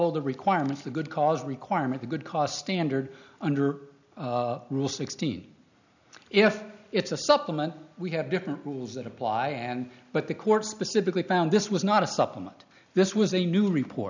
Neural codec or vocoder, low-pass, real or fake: none; 7.2 kHz; real